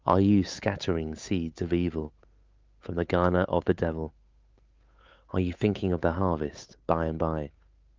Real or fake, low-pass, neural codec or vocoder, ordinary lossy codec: fake; 7.2 kHz; codec, 16 kHz, 8 kbps, FunCodec, trained on Chinese and English, 25 frames a second; Opus, 24 kbps